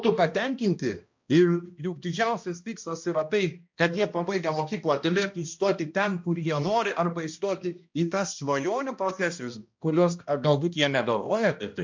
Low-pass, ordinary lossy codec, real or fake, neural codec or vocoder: 7.2 kHz; MP3, 48 kbps; fake; codec, 16 kHz, 1 kbps, X-Codec, HuBERT features, trained on balanced general audio